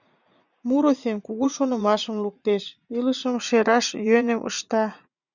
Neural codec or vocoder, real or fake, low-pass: vocoder, 44.1 kHz, 80 mel bands, Vocos; fake; 7.2 kHz